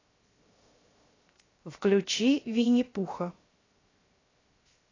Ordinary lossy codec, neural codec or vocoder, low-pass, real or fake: AAC, 32 kbps; codec, 16 kHz, 0.7 kbps, FocalCodec; 7.2 kHz; fake